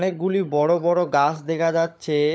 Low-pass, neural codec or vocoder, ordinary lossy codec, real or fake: none; codec, 16 kHz, 16 kbps, FunCodec, trained on LibriTTS, 50 frames a second; none; fake